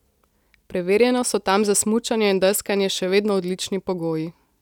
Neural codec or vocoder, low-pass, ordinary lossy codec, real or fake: none; 19.8 kHz; none; real